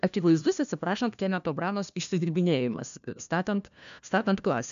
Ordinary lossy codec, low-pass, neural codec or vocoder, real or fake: AAC, 96 kbps; 7.2 kHz; codec, 16 kHz, 1 kbps, FunCodec, trained on LibriTTS, 50 frames a second; fake